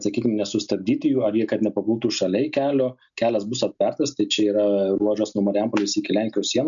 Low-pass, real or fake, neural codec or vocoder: 7.2 kHz; real; none